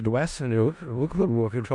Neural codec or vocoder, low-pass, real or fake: codec, 16 kHz in and 24 kHz out, 0.4 kbps, LongCat-Audio-Codec, four codebook decoder; 10.8 kHz; fake